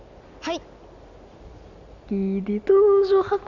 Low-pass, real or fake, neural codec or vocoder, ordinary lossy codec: 7.2 kHz; real; none; none